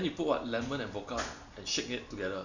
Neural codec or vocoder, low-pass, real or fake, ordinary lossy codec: none; 7.2 kHz; real; none